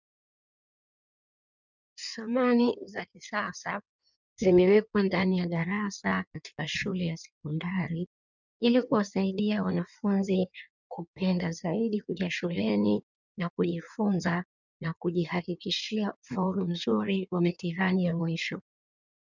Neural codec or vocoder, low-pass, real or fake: codec, 16 kHz in and 24 kHz out, 1.1 kbps, FireRedTTS-2 codec; 7.2 kHz; fake